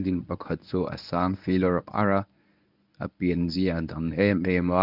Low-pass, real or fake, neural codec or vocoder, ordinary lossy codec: 5.4 kHz; fake; codec, 24 kHz, 0.9 kbps, WavTokenizer, medium speech release version 1; none